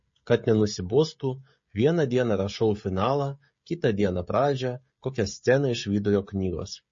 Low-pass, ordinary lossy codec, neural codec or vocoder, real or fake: 7.2 kHz; MP3, 32 kbps; codec, 16 kHz, 16 kbps, FreqCodec, smaller model; fake